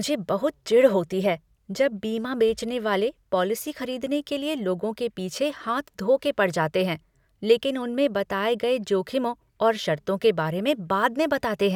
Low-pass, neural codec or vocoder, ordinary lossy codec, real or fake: 19.8 kHz; none; none; real